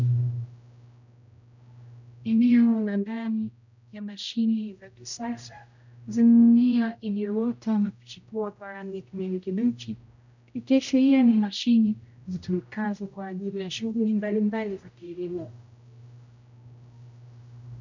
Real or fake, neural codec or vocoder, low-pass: fake; codec, 16 kHz, 0.5 kbps, X-Codec, HuBERT features, trained on general audio; 7.2 kHz